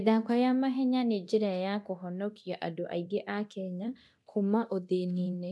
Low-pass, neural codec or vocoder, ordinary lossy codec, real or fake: none; codec, 24 kHz, 0.9 kbps, DualCodec; none; fake